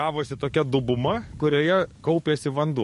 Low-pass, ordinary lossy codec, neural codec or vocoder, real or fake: 14.4 kHz; MP3, 48 kbps; codec, 44.1 kHz, 7.8 kbps, DAC; fake